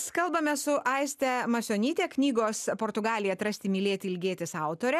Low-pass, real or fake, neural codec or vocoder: 14.4 kHz; real; none